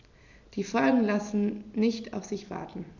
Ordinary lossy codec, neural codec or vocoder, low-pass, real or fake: none; none; 7.2 kHz; real